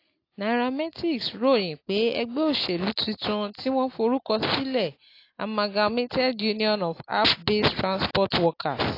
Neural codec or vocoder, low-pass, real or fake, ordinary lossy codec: none; 5.4 kHz; real; AAC, 32 kbps